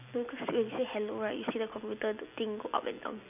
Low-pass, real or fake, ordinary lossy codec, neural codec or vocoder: 3.6 kHz; real; none; none